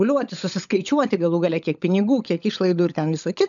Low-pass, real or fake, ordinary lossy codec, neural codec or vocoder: 7.2 kHz; fake; MP3, 96 kbps; codec, 16 kHz, 16 kbps, FunCodec, trained on LibriTTS, 50 frames a second